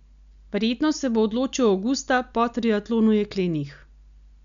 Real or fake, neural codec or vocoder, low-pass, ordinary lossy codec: real; none; 7.2 kHz; none